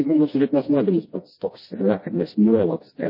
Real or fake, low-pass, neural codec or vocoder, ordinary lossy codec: fake; 5.4 kHz; codec, 16 kHz, 1 kbps, FreqCodec, smaller model; MP3, 24 kbps